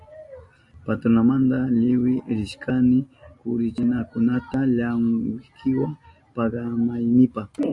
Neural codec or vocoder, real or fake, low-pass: none; real; 10.8 kHz